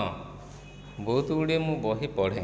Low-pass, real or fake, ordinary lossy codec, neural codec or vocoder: none; real; none; none